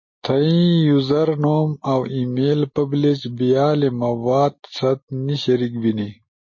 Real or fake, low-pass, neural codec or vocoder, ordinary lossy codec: real; 7.2 kHz; none; MP3, 32 kbps